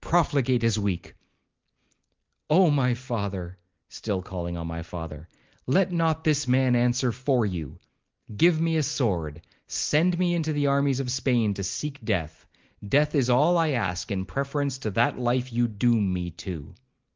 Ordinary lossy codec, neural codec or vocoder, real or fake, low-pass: Opus, 32 kbps; none; real; 7.2 kHz